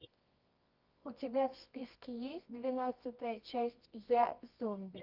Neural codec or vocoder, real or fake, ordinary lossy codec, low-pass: codec, 24 kHz, 0.9 kbps, WavTokenizer, medium music audio release; fake; Opus, 32 kbps; 5.4 kHz